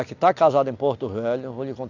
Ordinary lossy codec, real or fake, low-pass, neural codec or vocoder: AAC, 48 kbps; real; 7.2 kHz; none